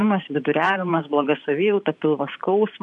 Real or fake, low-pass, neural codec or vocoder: fake; 10.8 kHz; vocoder, 44.1 kHz, 128 mel bands every 512 samples, BigVGAN v2